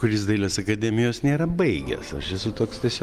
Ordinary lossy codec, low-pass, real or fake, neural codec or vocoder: Opus, 32 kbps; 14.4 kHz; real; none